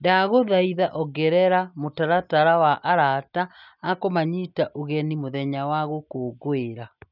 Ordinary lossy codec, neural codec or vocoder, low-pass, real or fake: none; none; 5.4 kHz; real